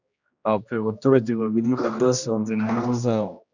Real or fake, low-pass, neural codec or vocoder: fake; 7.2 kHz; codec, 16 kHz, 1 kbps, X-Codec, HuBERT features, trained on general audio